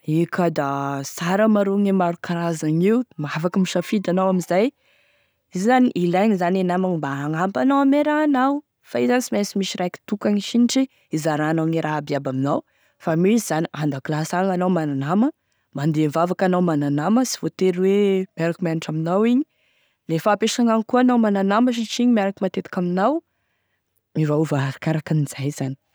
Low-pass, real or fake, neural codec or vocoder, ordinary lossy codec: none; real; none; none